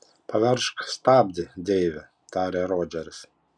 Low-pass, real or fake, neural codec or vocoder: 9.9 kHz; real; none